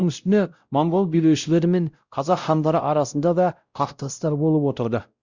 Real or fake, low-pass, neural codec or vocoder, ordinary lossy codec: fake; 7.2 kHz; codec, 16 kHz, 0.5 kbps, X-Codec, WavLM features, trained on Multilingual LibriSpeech; Opus, 64 kbps